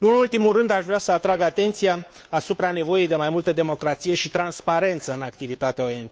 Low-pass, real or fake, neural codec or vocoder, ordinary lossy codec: none; fake; codec, 16 kHz, 2 kbps, FunCodec, trained on Chinese and English, 25 frames a second; none